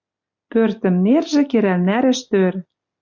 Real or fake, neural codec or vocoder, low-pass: real; none; 7.2 kHz